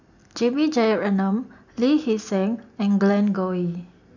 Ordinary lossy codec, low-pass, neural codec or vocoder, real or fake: none; 7.2 kHz; none; real